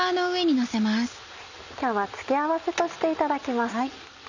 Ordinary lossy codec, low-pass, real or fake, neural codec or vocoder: none; 7.2 kHz; real; none